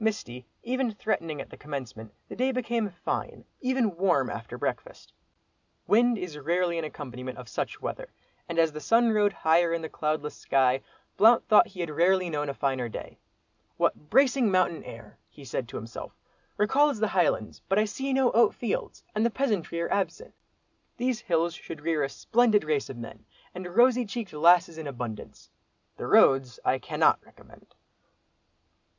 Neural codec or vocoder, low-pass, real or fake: none; 7.2 kHz; real